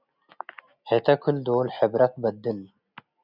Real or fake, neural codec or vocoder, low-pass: real; none; 5.4 kHz